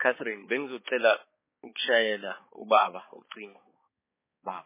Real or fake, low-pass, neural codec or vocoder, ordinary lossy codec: fake; 3.6 kHz; codec, 16 kHz, 4 kbps, X-Codec, HuBERT features, trained on balanced general audio; MP3, 16 kbps